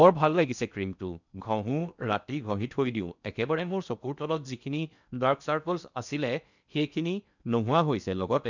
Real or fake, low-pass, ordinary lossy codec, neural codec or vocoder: fake; 7.2 kHz; none; codec, 16 kHz in and 24 kHz out, 0.8 kbps, FocalCodec, streaming, 65536 codes